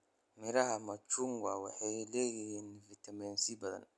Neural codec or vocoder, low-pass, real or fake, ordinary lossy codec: none; none; real; none